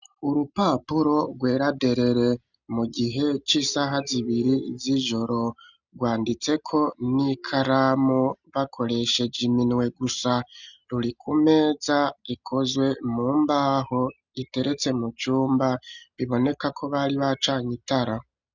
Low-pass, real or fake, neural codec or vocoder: 7.2 kHz; real; none